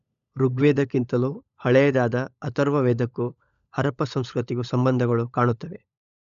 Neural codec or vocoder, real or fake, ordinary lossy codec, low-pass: codec, 16 kHz, 16 kbps, FunCodec, trained on LibriTTS, 50 frames a second; fake; none; 7.2 kHz